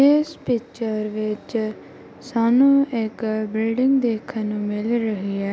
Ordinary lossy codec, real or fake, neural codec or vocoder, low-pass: none; real; none; none